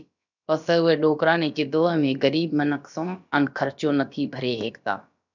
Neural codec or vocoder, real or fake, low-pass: codec, 16 kHz, about 1 kbps, DyCAST, with the encoder's durations; fake; 7.2 kHz